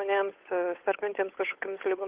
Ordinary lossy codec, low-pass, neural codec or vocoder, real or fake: Opus, 16 kbps; 3.6 kHz; codec, 16 kHz, 8 kbps, FunCodec, trained on Chinese and English, 25 frames a second; fake